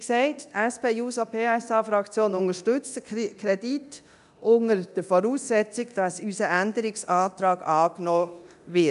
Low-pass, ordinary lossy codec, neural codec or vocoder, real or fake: 10.8 kHz; none; codec, 24 kHz, 0.9 kbps, DualCodec; fake